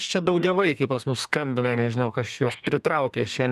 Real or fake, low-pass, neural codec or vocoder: fake; 14.4 kHz; codec, 32 kHz, 1.9 kbps, SNAC